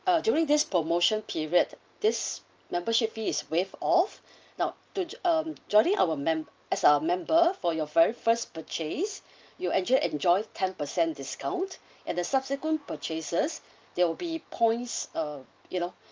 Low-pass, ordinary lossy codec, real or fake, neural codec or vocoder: 7.2 kHz; Opus, 24 kbps; real; none